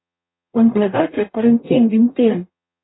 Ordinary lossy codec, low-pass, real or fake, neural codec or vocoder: AAC, 16 kbps; 7.2 kHz; fake; codec, 44.1 kHz, 0.9 kbps, DAC